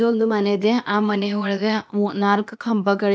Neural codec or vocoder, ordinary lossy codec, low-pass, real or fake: codec, 16 kHz, 0.8 kbps, ZipCodec; none; none; fake